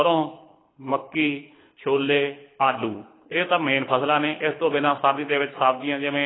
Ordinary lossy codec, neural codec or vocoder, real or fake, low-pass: AAC, 16 kbps; codec, 24 kHz, 6 kbps, HILCodec; fake; 7.2 kHz